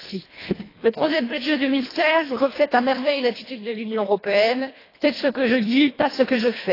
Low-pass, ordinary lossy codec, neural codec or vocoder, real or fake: 5.4 kHz; AAC, 24 kbps; codec, 24 kHz, 1.5 kbps, HILCodec; fake